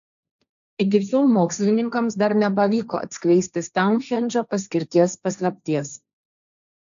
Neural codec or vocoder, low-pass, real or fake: codec, 16 kHz, 1.1 kbps, Voila-Tokenizer; 7.2 kHz; fake